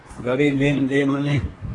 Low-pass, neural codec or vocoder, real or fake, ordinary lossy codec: 10.8 kHz; codec, 24 kHz, 1 kbps, SNAC; fake; AAC, 32 kbps